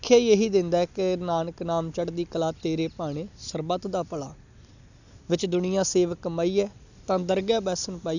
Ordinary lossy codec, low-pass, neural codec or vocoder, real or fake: none; 7.2 kHz; none; real